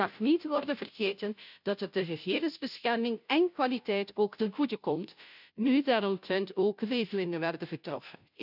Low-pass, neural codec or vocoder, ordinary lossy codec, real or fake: 5.4 kHz; codec, 16 kHz, 0.5 kbps, FunCodec, trained on Chinese and English, 25 frames a second; none; fake